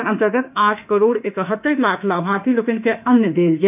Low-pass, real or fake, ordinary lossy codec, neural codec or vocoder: 3.6 kHz; fake; none; autoencoder, 48 kHz, 32 numbers a frame, DAC-VAE, trained on Japanese speech